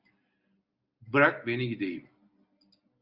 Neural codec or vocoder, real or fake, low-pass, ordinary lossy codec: none; real; 5.4 kHz; Opus, 64 kbps